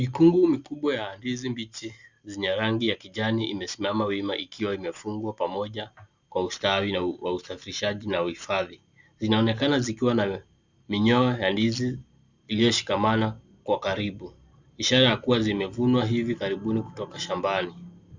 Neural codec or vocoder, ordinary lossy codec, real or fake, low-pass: none; Opus, 64 kbps; real; 7.2 kHz